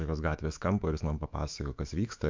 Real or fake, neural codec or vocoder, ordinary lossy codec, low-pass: fake; autoencoder, 48 kHz, 128 numbers a frame, DAC-VAE, trained on Japanese speech; MP3, 64 kbps; 7.2 kHz